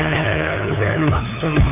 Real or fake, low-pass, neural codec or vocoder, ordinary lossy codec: fake; 3.6 kHz; codec, 16 kHz, 2 kbps, FunCodec, trained on LibriTTS, 25 frames a second; AAC, 24 kbps